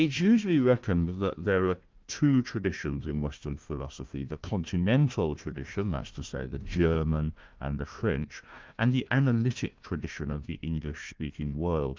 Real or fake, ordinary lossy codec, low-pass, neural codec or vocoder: fake; Opus, 32 kbps; 7.2 kHz; codec, 16 kHz, 1 kbps, FunCodec, trained on Chinese and English, 50 frames a second